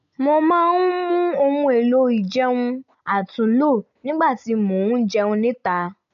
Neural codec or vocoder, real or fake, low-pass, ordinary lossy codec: none; real; 7.2 kHz; none